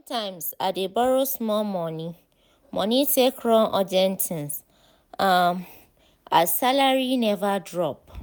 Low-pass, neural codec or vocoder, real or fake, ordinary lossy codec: none; none; real; none